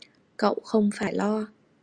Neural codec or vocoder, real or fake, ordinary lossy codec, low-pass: none; real; Opus, 64 kbps; 9.9 kHz